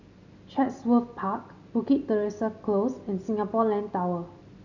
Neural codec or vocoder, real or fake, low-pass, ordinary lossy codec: none; real; 7.2 kHz; none